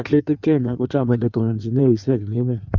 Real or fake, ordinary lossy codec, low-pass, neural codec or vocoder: fake; none; 7.2 kHz; codec, 16 kHz in and 24 kHz out, 1.1 kbps, FireRedTTS-2 codec